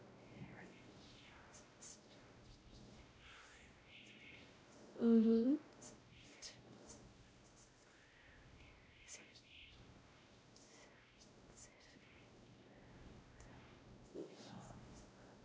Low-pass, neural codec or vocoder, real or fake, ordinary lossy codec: none; codec, 16 kHz, 0.5 kbps, X-Codec, WavLM features, trained on Multilingual LibriSpeech; fake; none